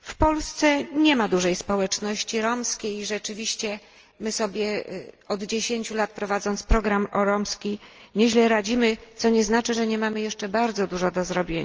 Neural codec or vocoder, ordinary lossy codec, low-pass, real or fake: none; Opus, 24 kbps; 7.2 kHz; real